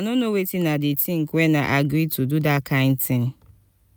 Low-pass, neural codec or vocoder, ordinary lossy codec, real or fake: none; none; none; real